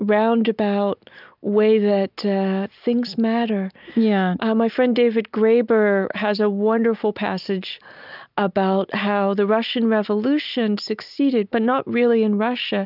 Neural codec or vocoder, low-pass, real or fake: none; 5.4 kHz; real